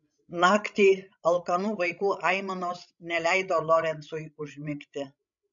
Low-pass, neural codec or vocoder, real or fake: 7.2 kHz; codec, 16 kHz, 16 kbps, FreqCodec, larger model; fake